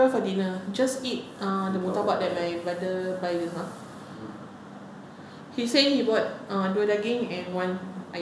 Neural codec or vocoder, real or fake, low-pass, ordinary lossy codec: none; real; none; none